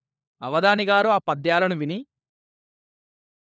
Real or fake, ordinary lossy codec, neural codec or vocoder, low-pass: fake; none; codec, 16 kHz, 4 kbps, FunCodec, trained on LibriTTS, 50 frames a second; none